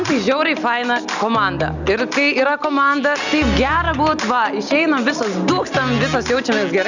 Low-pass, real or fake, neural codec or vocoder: 7.2 kHz; real; none